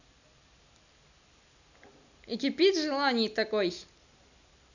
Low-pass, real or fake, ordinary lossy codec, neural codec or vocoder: 7.2 kHz; real; none; none